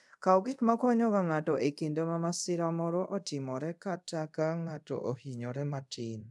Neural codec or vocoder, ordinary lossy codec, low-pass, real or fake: codec, 24 kHz, 0.5 kbps, DualCodec; none; none; fake